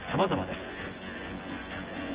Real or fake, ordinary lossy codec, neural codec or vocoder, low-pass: fake; Opus, 16 kbps; vocoder, 24 kHz, 100 mel bands, Vocos; 3.6 kHz